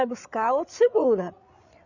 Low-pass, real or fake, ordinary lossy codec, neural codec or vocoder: 7.2 kHz; fake; none; codec, 16 kHz, 8 kbps, FreqCodec, larger model